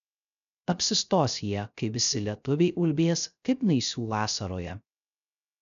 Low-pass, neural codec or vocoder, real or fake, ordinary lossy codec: 7.2 kHz; codec, 16 kHz, 0.3 kbps, FocalCodec; fake; MP3, 96 kbps